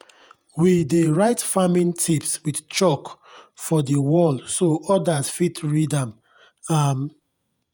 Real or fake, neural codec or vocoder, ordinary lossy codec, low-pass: fake; vocoder, 48 kHz, 128 mel bands, Vocos; none; none